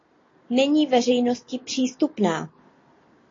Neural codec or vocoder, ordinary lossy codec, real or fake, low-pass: none; AAC, 32 kbps; real; 7.2 kHz